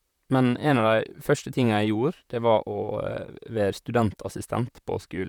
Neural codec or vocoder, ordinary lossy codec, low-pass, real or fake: vocoder, 44.1 kHz, 128 mel bands, Pupu-Vocoder; none; 19.8 kHz; fake